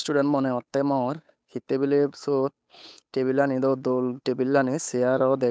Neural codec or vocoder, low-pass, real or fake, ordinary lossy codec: codec, 16 kHz, 8 kbps, FunCodec, trained on Chinese and English, 25 frames a second; none; fake; none